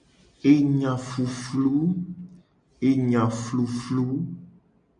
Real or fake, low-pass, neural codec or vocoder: real; 9.9 kHz; none